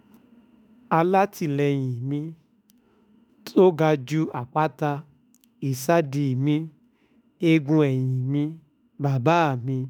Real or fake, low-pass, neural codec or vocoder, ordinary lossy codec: fake; none; autoencoder, 48 kHz, 32 numbers a frame, DAC-VAE, trained on Japanese speech; none